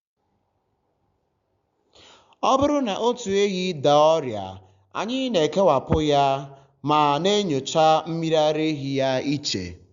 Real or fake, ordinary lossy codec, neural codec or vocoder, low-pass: real; Opus, 64 kbps; none; 7.2 kHz